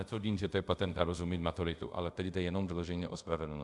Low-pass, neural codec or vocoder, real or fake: 10.8 kHz; codec, 24 kHz, 0.5 kbps, DualCodec; fake